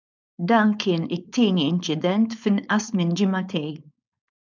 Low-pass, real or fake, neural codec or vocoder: 7.2 kHz; fake; codec, 16 kHz, 4.8 kbps, FACodec